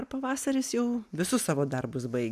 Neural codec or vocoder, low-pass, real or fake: none; 14.4 kHz; real